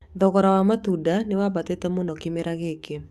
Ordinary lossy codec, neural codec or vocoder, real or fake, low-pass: Opus, 64 kbps; codec, 44.1 kHz, 7.8 kbps, DAC; fake; 14.4 kHz